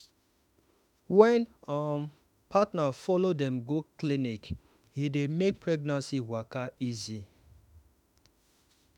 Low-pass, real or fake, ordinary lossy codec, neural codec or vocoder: 19.8 kHz; fake; none; autoencoder, 48 kHz, 32 numbers a frame, DAC-VAE, trained on Japanese speech